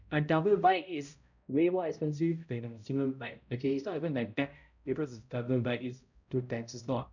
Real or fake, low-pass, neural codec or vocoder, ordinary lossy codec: fake; 7.2 kHz; codec, 16 kHz, 0.5 kbps, X-Codec, HuBERT features, trained on balanced general audio; none